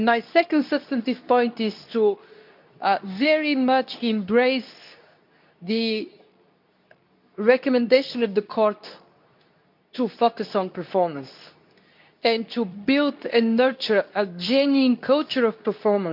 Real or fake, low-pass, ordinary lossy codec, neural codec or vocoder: fake; 5.4 kHz; none; codec, 24 kHz, 0.9 kbps, WavTokenizer, medium speech release version 2